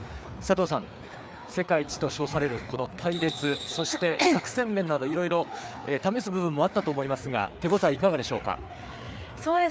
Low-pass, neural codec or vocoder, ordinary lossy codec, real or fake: none; codec, 16 kHz, 4 kbps, FreqCodec, larger model; none; fake